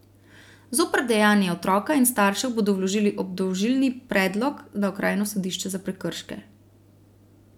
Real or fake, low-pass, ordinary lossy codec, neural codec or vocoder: real; 19.8 kHz; none; none